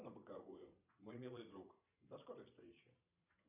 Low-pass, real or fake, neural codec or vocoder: 3.6 kHz; fake; vocoder, 22.05 kHz, 80 mel bands, Vocos